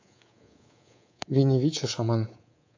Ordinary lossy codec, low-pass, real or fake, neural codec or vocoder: AAC, 48 kbps; 7.2 kHz; fake; codec, 24 kHz, 3.1 kbps, DualCodec